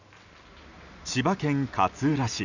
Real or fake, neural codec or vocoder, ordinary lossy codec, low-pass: real; none; none; 7.2 kHz